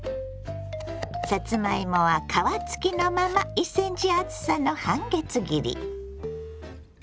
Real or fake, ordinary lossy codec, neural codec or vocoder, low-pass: real; none; none; none